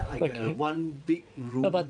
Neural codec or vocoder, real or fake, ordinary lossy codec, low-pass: vocoder, 22.05 kHz, 80 mel bands, WaveNeXt; fake; none; 9.9 kHz